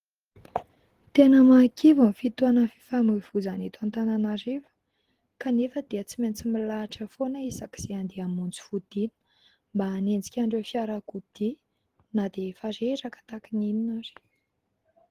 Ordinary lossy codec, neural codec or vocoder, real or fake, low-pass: Opus, 16 kbps; none; real; 14.4 kHz